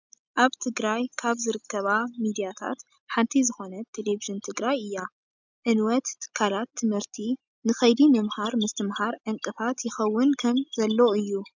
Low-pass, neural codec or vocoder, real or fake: 7.2 kHz; none; real